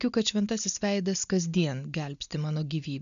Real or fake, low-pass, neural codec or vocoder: real; 7.2 kHz; none